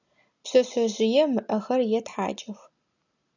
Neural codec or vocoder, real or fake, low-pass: none; real; 7.2 kHz